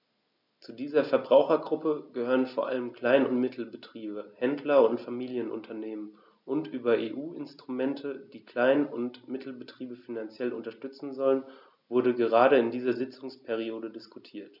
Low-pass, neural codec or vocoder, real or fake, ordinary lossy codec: 5.4 kHz; none; real; none